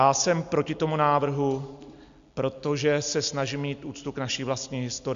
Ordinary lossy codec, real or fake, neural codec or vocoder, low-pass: MP3, 64 kbps; real; none; 7.2 kHz